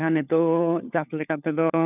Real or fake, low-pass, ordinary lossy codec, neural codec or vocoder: fake; 3.6 kHz; none; codec, 16 kHz, 4 kbps, FunCodec, trained on LibriTTS, 50 frames a second